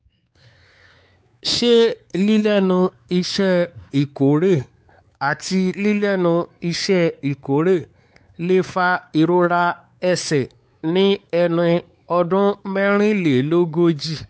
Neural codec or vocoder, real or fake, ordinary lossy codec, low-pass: codec, 16 kHz, 4 kbps, X-Codec, WavLM features, trained on Multilingual LibriSpeech; fake; none; none